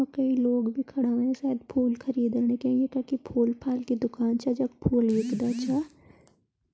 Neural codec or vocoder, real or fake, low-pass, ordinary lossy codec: none; real; none; none